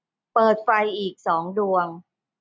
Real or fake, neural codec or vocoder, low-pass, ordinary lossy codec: real; none; none; none